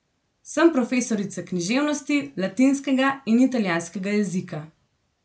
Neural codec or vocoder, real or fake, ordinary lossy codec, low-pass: none; real; none; none